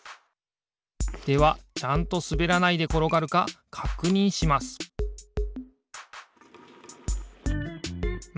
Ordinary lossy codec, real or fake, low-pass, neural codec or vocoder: none; real; none; none